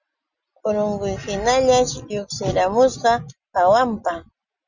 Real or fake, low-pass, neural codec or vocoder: real; 7.2 kHz; none